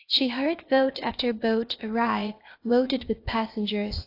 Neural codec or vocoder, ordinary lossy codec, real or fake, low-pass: codec, 16 kHz, 0.8 kbps, ZipCodec; AAC, 32 kbps; fake; 5.4 kHz